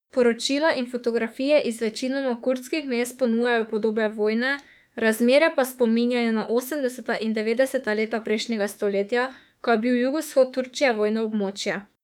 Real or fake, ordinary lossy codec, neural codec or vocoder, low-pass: fake; none; autoencoder, 48 kHz, 32 numbers a frame, DAC-VAE, trained on Japanese speech; 19.8 kHz